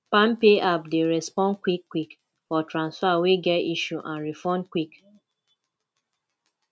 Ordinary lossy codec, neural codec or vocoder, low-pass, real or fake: none; none; none; real